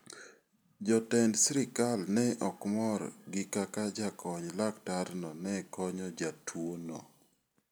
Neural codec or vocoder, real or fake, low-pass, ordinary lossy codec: none; real; none; none